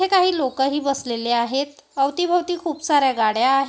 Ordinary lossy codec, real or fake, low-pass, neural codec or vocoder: none; real; none; none